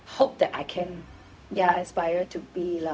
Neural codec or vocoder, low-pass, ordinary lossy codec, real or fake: codec, 16 kHz, 0.4 kbps, LongCat-Audio-Codec; none; none; fake